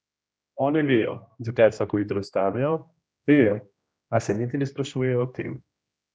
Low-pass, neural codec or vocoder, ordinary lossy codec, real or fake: none; codec, 16 kHz, 1 kbps, X-Codec, HuBERT features, trained on general audio; none; fake